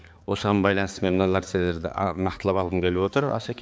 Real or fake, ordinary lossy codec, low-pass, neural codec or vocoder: fake; none; none; codec, 16 kHz, 4 kbps, X-Codec, HuBERT features, trained on balanced general audio